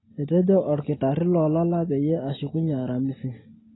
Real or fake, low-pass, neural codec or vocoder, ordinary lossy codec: real; 7.2 kHz; none; AAC, 16 kbps